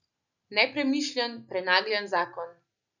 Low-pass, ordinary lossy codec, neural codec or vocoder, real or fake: 7.2 kHz; none; none; real